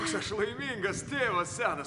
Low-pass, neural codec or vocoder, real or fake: 10.8 kHz; none; real